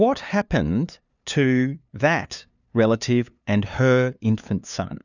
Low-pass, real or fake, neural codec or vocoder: 7.2 kHz; fake; codec, 16 kHz, 2 kbps, FunCodec, trained on LibriTTS, 25 frames a second